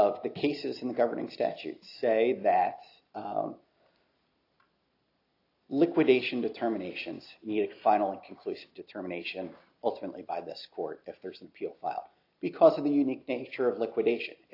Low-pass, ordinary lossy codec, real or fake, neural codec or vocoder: 5.4 kHz; AAC, 32 kbps; real; none